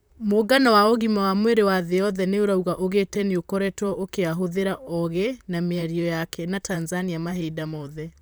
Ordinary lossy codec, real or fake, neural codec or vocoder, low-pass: none; fake; vocoder, 44.1 kHz, 128 mel bands, Pupu-Vocoder; none